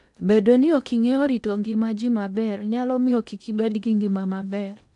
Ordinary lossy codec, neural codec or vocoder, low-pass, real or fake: none; codec, 16 kHz in and 24 kHz out, 0.8 kbps, FocalCodec, streaming, 65536 codes; 10.8 kHz; fake